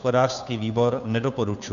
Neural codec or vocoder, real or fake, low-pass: codec, 16 kHz, 2 kbps, FunCodec, trained on Chinese and English, 25 frames a second; fake; 7.2 kHz